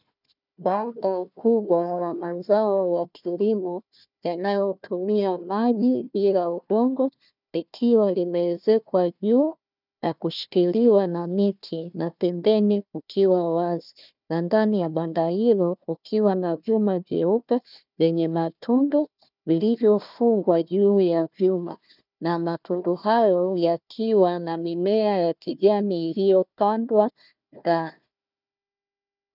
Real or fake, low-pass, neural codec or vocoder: fake; 5.4 kHz; codec, 16 kHz, 1 kbps, FunCodec, trained on Chinese and English, 50 frames a second